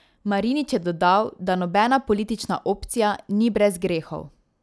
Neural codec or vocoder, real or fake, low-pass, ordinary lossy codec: none; real; none; none